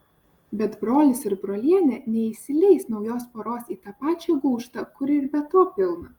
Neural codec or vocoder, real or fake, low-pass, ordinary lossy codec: none; real; 19.8 kHz; Opus, 32 kbps